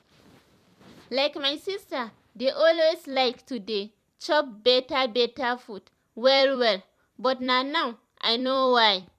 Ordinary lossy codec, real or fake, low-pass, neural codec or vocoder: none; fake; 14.4 kHz; vocoder, 44.1 kHz, 128 mel bands every 256 samples, BigVGAN v2